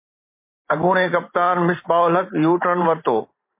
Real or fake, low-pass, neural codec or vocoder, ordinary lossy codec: fake; 3.6 kHz; vocoder, 44.1 kHz, 128 mel bands every 512 samples, BigVGAN v2; MP3, 24 kbps